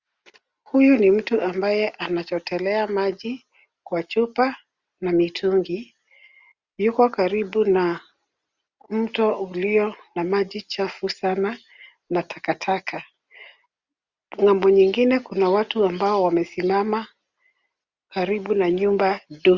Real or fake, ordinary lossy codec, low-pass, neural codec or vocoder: real; Opus, 64 kbps; 7.2 kHz; none